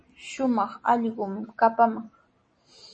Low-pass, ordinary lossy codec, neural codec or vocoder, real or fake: 9.9 kHz; MP3, 32 kbps; none; real